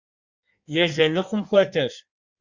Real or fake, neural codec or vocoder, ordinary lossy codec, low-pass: fake; codec, 16 kHz in and 24 kHz out, 1.1 kbps, FireRedTTS-2 codec; Opus, 64 kbps; 7.2 kHz